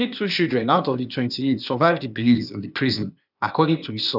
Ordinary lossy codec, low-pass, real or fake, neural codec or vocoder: none; 5.4 kHz; fake; codec, 16 kHz, 0.8 kbps, ZipCodec